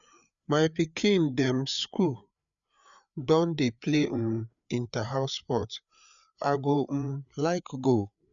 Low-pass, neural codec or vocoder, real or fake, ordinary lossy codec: 7.2 kHz; codec, 16 kHz, 4 kbps, FreqCodec, larger model; fake; none